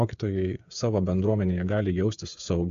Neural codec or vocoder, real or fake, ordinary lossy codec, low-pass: codec, 16 kHz, 8 kbps, FreqCodec, smaller model; fake; AAC, 64 kbps; 7.2 kHz